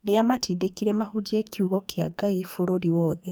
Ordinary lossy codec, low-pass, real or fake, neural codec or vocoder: none; none; fake; codec, 44.1 kHz, 2.6 kbps, SNAC